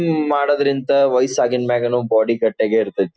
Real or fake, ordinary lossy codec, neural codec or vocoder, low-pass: real; none; none; none